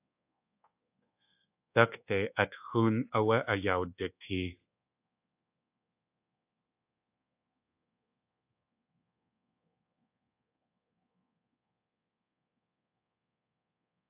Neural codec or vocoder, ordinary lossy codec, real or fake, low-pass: codec, 24 kHz, 1.2 kbps, DualCodec; AAC, 32 kbps; fake; 3.6 kHz